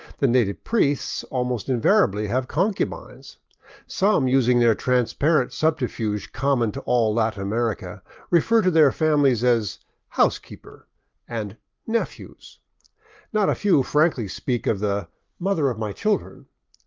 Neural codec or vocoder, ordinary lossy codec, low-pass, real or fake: none; Opus, 32 kbps; 7.2 kHz; real